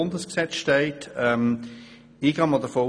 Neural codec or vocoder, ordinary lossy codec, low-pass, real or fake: none; none; 9.9 kHz; real